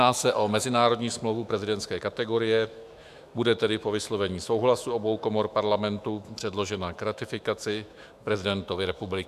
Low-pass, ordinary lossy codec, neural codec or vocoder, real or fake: 14.4 kHz; AAC, 96 kbps; autoencoder, 48 kHz, 128 numbers a frame, DAC-VAE, trained on Japanese speech; fake